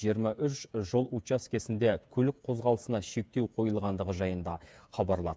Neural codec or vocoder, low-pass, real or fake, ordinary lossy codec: codec, 16 kHz, 8 kbps, FreqCodec, smaller model; none; fake; none